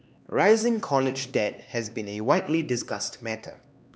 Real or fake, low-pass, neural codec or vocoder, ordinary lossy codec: fake; none; codec, 16 kHz, 2 kbps, X-Codec, HuBERT features, trained on LibriSpeech; none